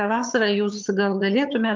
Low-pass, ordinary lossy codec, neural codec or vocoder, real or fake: 7.2 kHz; Opus, 32 kbps; vocoder, 22.05 kHz, 80 mel bands, HiFi-GAN; fake